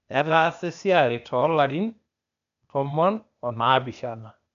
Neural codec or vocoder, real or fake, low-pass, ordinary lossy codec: codec, 16 kHz, 0.8 kbps, ZipCodec; fake; 7.2 kHz; none